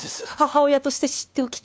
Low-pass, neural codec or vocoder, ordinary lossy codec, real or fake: none; codec, 16 kHz, 2 kbps, FunCodec, trained on LibriTTS, 25 frames a second; none; fake